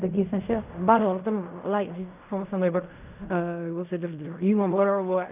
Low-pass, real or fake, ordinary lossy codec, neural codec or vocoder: 3.6 kHz; fake; none; codec, 16 kHz in and 24 kHz out, 0.4 kbps, LongCat-Audio-Codec, fine tuned four codebook decoder